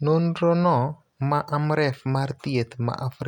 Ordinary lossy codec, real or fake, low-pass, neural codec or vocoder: none; real; 19.8 kHz; none